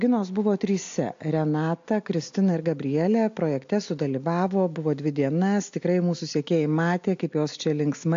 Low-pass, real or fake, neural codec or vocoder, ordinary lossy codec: 7.2 kHz; real; none; AAC, 48 kbps